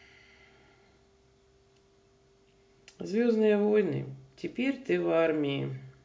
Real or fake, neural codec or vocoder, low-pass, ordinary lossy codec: real; none; none; none